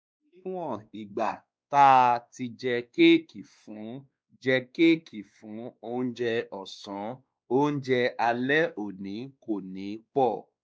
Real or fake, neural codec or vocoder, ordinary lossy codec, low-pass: fake; codec, 16 kHz, 2 kbps, X-Codec, WavLM features, trained on Multilingual LibriSpeech; none; none